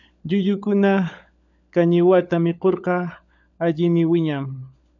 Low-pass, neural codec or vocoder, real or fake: 7.2 kHz; codec, 16 kHz, 16 kbps, FunCodec, trained on LibriTTS, 50 frames a second; fake